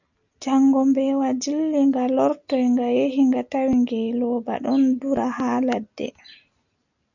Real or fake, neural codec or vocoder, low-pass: real; none; 7.2 kHz